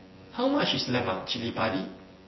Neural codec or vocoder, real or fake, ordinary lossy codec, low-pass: vocoder, 24 kHz, 100 mel bands, Vocos; fake; MP3, 24 kbps; 7.2 kHz